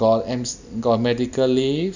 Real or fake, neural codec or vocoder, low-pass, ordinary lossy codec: real; none; 7.2 kHz; none